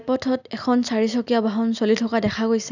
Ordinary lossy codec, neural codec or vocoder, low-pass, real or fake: none; none; 7.2 kHz; real